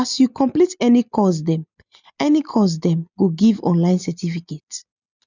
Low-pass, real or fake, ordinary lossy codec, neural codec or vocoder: 7.2 kHz; real; none; none